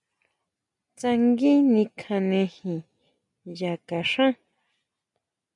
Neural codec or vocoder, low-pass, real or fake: none; 10.8 kHz; real